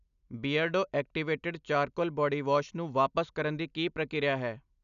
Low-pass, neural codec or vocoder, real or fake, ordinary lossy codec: 7.2 kHz; none; real; none